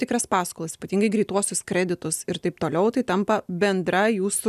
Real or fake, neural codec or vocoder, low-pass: real; none; 14.4 kHz